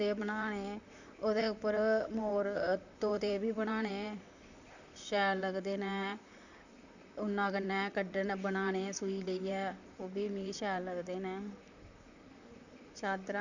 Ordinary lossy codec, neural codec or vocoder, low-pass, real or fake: none; vocoder, 44.1 kHz, 128 mel bands, Pupu-Vocoder; 7.2 kHz; fake